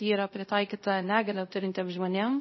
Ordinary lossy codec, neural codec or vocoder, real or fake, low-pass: MP3, 24 kbps; codec, 24 kHz, 0.5 kbps, DualCodec; fake; 7.2 kHz